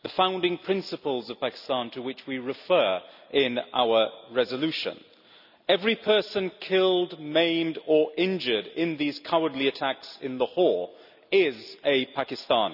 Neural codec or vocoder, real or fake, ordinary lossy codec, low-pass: none; real; none; 5.4 kHz